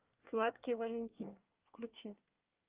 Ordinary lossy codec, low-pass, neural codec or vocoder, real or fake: Opus, 32 kbps; 3.6 kHz; codec, 24 kHz, 1 kbps, SNAC; fake